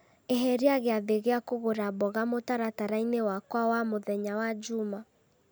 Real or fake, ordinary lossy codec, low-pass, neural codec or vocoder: real; none; none; none